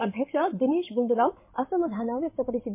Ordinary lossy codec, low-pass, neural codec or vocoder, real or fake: MP3, 24 kbps; 3.6 kHz; codec, 16 kHz in and 24 kHz out, 2.2 kbps, FireRedTTS-2 codec; fake